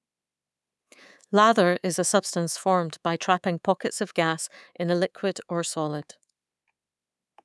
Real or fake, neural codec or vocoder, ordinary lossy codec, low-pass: fake; codec, 24 kHz, 3.1 kbps, DualCodec; none; none